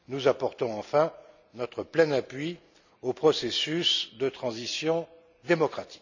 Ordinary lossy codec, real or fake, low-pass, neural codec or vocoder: none; real; 7.2 kHz; none